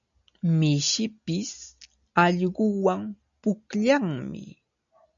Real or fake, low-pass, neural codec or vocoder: real; 7.2 kHz; none